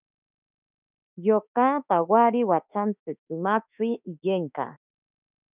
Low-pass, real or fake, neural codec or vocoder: 3.6 kHz; fake; autoencoder, 48 kHz, 32 numbers a frame, DAC-VAE, trained on Japanese speech